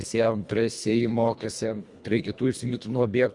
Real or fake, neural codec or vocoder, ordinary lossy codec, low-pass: fake; codec, 24 kHz, 1.5 kbps, HILCodec; Opus, 64 kbps; 10.8 kHz